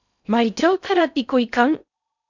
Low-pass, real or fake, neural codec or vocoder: 7.2 kHz; fake; codec, 16 kHz in and 24 kHz out, 0.6 kbps, FocalCodec, streaming, 2048 codes